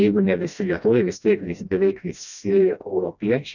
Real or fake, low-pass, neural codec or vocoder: fake; 7.2 kHz; codec, 16 kHz, 1 kbps, FreqCodec, smaller model